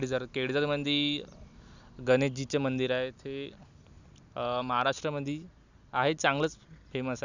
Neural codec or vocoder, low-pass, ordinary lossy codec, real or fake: none; 7.2 kHz; none; real